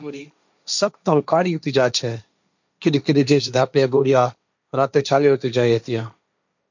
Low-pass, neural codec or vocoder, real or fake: 7.2 kHz; codec, 16 kHz, 1.1 kbps, Voila-Tokenizer; fake